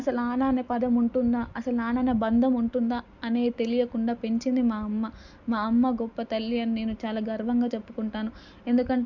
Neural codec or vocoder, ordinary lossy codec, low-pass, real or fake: none; none; 7.2 kHz; real